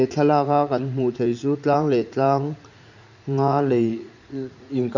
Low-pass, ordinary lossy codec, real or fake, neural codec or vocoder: 7.2 kHz; none; fake; vocoder, 44.1 kHz, 80 mel bands, Vocos